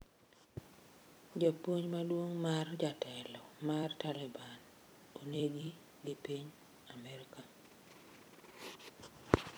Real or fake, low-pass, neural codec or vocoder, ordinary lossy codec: fake; none; vocoder, 44.1 kHz, 128 mel bands every 256 samples, BigVGAN v2; none